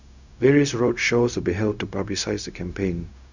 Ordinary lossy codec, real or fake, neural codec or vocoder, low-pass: none; fake; codec, 16 kHz, 0.4 kbps, LongCat-Audio-Codec; 7.2 kHz